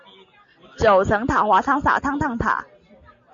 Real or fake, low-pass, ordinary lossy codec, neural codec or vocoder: real; 7.2 kHz; MP3, 48 kbps; none